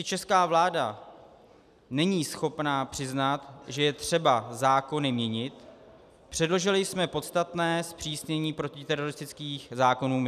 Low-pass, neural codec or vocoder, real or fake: 14.4 kHz; none; real